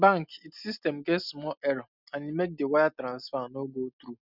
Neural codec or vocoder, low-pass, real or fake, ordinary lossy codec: none; 5.4 kHz; real; none